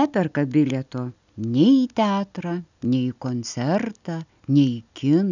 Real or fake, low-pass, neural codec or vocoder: real; 7.2 kHz; none